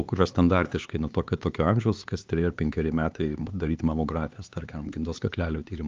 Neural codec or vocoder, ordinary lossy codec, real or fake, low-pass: codec, 16 kHz, 4 kbps, X-Codec, HuBERT features, trained on LibriSpeech; Opus, 24 kbps; fake; 7.2 kHz